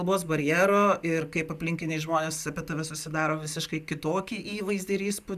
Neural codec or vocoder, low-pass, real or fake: none; 14.4 kHz; real